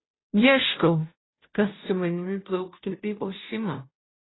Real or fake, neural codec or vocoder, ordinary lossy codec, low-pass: fake; codec, 16 kHz, 0.5 kbps, FunCodec, trained on Chinese and English, 25 frames a second; AAC, 16 kbps; 7.2 kHz